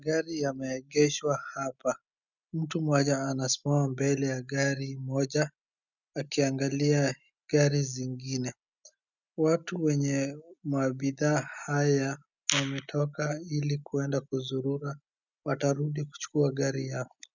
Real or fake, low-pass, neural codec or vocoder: real; 7.2 kHz; none